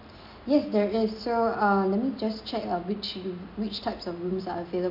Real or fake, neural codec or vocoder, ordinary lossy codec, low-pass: real; none; MP3, 48 kbps; 5.4 kHz